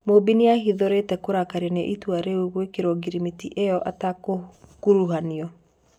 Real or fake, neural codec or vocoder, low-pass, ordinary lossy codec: real; none; 19.8 kHz; none